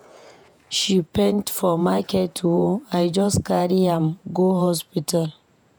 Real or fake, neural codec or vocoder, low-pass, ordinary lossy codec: fake; vocoder, 48 kHz, 128 mel bands, Vocos; none; none